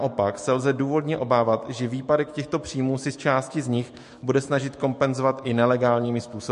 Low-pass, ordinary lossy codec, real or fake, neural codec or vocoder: 14.4 kHz; MP3, 48 kbps; fake; autoencoder, 48 kHz, 128 numbers a frame, DAC-VAE, trained on Japanese speech